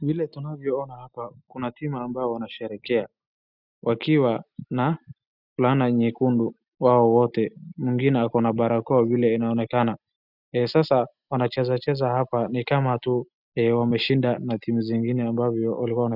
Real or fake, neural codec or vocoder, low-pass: real; none; 5.4 kHz